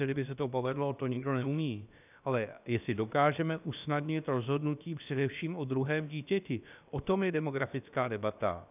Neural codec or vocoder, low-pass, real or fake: codec, 16 kHz, about 1 kbps, DyCAST, with the encoder's durations; 3.6 kHz; fake